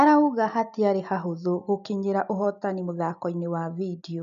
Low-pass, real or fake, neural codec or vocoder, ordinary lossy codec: 7.2 kHz; real; none; none